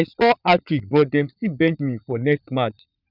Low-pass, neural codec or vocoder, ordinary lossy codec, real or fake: 5.4 kHz; vocoder, 44.1 kHz, 80 mel bands, Vocos; none; fake